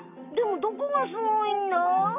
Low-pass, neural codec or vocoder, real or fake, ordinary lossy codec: 3.6 kHz; none; real; none